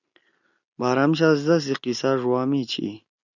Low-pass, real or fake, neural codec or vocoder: 7.2 kHz; real; none